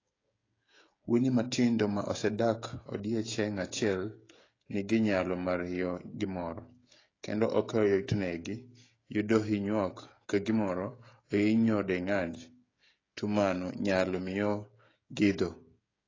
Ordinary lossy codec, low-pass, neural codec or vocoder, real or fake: AAC, 32 kbps; 7.2 kHz; codec, 16 kHz, 16 kbps, FreqCodec, smaller model; fake